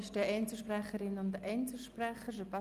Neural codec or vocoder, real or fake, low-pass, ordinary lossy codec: none; real; 14.4 kHz; Opus, 32 kbps